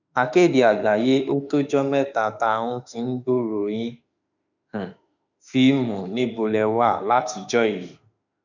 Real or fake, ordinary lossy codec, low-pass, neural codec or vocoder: fake; none; 7.2 kHz; autoencoder, 48 kHz, 32 numbers a frame, DAC-VAE, trained on Japanese speech